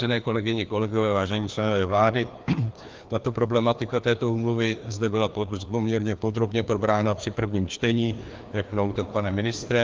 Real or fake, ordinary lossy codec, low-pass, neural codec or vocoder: fake; Opus, 32 kbps; 7.2 kHz; codec, 16 kHz, 2 kbps, FreqCodec, larger model